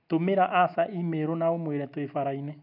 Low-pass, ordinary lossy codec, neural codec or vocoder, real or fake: 5.4 kHz; none; none; real